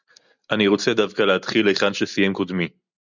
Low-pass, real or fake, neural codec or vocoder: 7.2 kHz; real; none